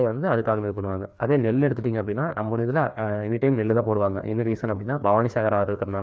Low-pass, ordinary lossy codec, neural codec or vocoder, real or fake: none; none; codec, 16 kHz, 2 kbps, FreqCodec, larger model; fake